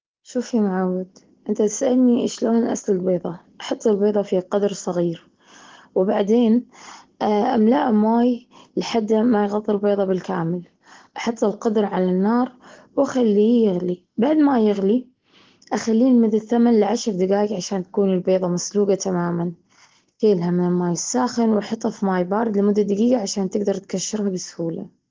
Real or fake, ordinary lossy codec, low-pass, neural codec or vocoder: real; Opus, 16 kbps; 7.2 kHz; none